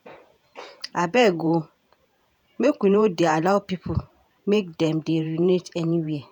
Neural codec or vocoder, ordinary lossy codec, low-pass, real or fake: vocoder, 48 kHz, 128 mel bands, Vocos; none; 19.8 kHz; fake